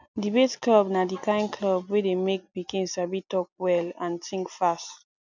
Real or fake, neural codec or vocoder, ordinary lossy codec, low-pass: real; none; none; 7.2 kHz